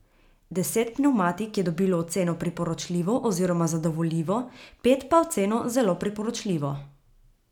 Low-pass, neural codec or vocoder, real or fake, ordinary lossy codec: 19.8 kHz; none; real; none